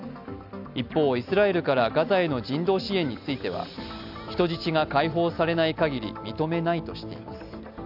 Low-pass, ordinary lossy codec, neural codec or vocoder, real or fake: 5.4 kHz; none; none; real